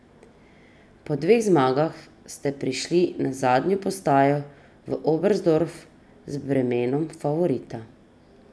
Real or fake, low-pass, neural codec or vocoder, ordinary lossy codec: real; none; none; none